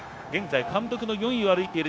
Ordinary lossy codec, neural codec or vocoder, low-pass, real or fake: none; codec, 16 kHz, 0.9 kbps, LongCat-Audio-Codec; none; fake